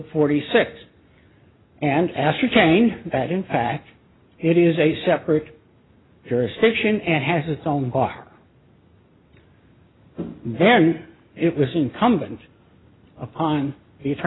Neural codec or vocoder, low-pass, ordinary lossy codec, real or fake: none; 7.2 kHz; AAC, 16 kbps; real